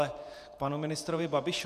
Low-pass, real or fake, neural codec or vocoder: 14.4 kHz; fake; vocoder, 48 kHz, 128 mel bands, Vocos